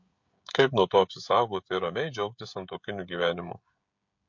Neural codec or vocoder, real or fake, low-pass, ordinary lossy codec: codec, 16 kHz, 16 kbps, FreqCodec, smaller model; fake; 7.2 kHz; MP3, 48 kbps